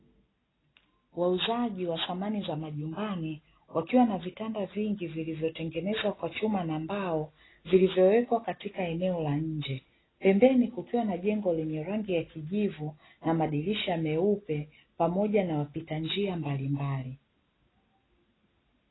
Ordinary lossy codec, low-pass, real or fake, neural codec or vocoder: AAC, 16 kbps; 7.2 kHz; real; none